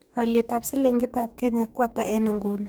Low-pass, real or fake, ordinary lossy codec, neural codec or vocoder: none; fake; none; codec, 44.1 kHz, 2.6 kbps, DAC